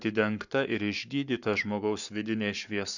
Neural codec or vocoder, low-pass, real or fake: codec, 44.1 kHz, 7.8 kbps, Pupu-Codec; 7.2 kHz; fake